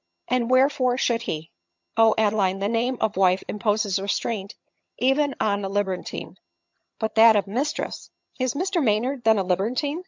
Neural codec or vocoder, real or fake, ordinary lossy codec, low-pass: vocoder, 22.05 kHz, 80 mel bands, HiFi-GAN; fake; MP3, 64 kbps; 7.2 kHz